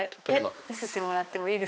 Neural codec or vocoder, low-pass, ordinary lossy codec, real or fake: codec, 16 kHz, 2 kbps, X-Codec, HuBERT features, trained on balanced general audio; none; none; fake